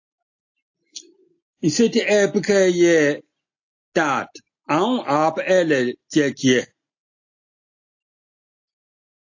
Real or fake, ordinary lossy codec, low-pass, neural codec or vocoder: real; AAC, 48 kbps; 7.2 kHz; none